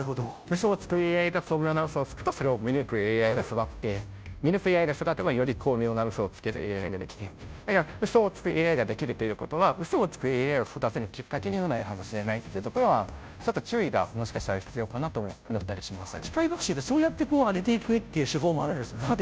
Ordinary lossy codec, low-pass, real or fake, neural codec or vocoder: none; none; fake; codec, 16 kHz, 0.5 kbps, FunCodec, trained on Chinese and English, 25 frames a second